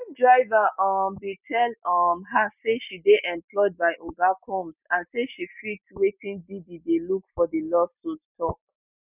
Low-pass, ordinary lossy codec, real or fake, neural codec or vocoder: 3.6 kHz; none; real; none